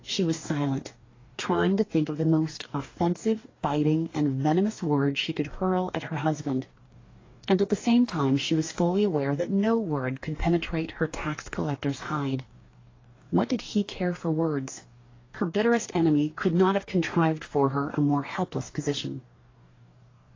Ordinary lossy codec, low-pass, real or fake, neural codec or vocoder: AAC, 32 kbps; 7.2 kHz; fake; codec, 44.1 kHz, 2.6 kbps, DAC